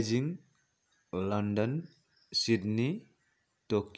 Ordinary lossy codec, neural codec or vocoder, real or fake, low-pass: none; none; real; none